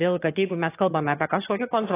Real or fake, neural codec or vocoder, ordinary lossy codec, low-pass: fake; vocoder, 22.05 kHz, 80 mel bands, HiFi-GAN; AAC, 16 kbps; 3.6 kHz